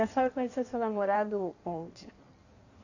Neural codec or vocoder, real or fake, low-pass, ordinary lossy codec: codec, 16 kHz in and 24 kHz out, 1.1 kbps, FireRedTTS-2 codec; fake; 7.2 kHz; none